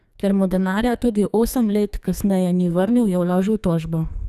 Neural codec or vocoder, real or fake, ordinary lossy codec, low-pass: codec, 44.1 kHz, 2.6 kbps, SNAC; fake; none; 14.4 kHz